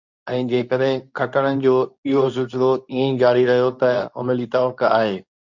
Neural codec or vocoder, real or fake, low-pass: codec, 24 kHz, 0.9 kbps, WavTokenizer, medium speech release version 2; fake; 7.2 kHz